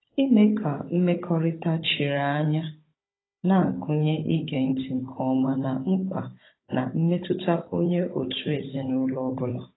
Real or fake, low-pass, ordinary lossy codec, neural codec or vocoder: fake; 7.2 kHz; AAC, 16 kbps; codec, 16 kHz, 16 kbps, FunCodec, trained on Chinese and English, 50 frames a second